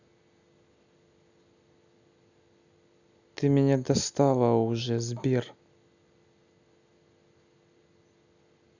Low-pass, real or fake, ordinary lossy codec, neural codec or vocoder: 7.2 kHz; real; none; none